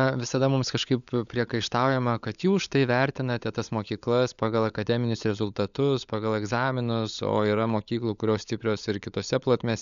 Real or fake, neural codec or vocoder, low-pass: fake; codec, 16 kHz, 16 kbps, FunCodec, trained on LibriTTS, 50 frames a second; 7.2 kHz